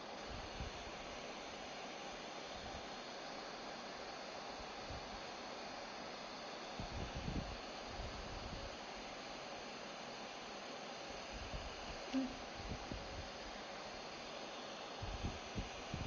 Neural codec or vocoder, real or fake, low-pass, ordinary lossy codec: none; real; 7.2 kHz; Opus, 32 kbps